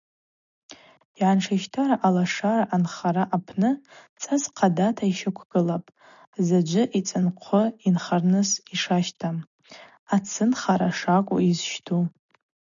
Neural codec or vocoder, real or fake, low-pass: none; real; 7.2 kHz